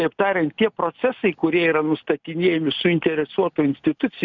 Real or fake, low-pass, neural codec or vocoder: real; 7.2 kHz; none